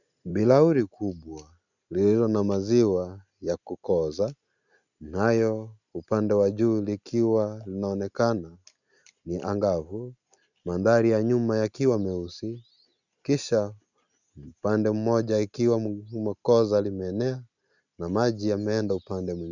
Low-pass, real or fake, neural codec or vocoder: 7.2 kHz; real; none